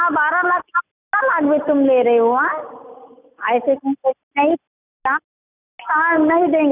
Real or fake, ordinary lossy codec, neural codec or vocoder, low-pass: real; none; none; 3.6 kHz